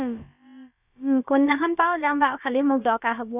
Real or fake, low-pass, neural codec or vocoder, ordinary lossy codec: fake; 3.6 kHz; codec, 16 kHz, about 1 kbps, DyCAST, with the encoder's durations; none